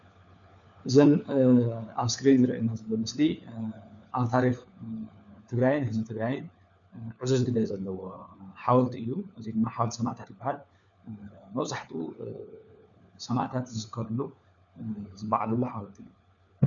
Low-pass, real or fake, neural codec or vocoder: 7.2 kHz; fake; codec, 16 kHz, 4 kbps, FunCodec, trained on LibriTTS, 50 frames a second